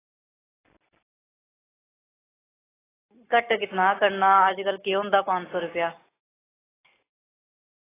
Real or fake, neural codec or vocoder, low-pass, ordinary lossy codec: real; none; 3.6 kHz; AAC, 16 kbps